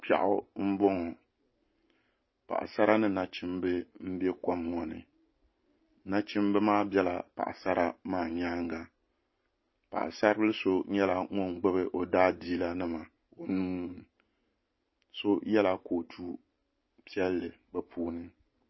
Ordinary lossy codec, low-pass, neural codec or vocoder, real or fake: MP3, 24 kbps; 7.2 kHz; none; real